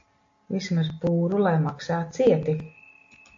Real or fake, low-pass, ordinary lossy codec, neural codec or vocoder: real; 7.2 kHz; AAC, 48 kbps; none